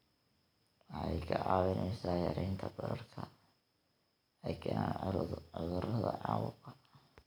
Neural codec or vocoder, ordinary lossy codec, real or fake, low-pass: vocoder, 44.1 kHz, 128 mel bands every 256 samples, BigVGAN v2; none; fake; none